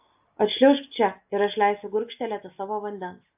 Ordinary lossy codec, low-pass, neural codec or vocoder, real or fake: AAC, 32 kbps; 3.6 kHz; none; real